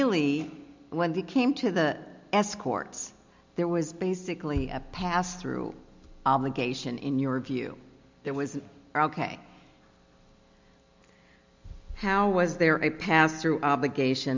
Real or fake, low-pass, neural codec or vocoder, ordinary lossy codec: real; 7.2 kHz; none; MP3, 64 kbps